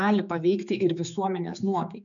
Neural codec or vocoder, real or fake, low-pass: codec, 16 kHz, 4 kbps, X-Codec, HuBERT features, trained on general audio; fake; 7.2 kHz